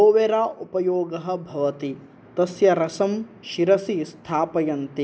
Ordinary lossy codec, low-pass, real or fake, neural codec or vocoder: none; none; real; none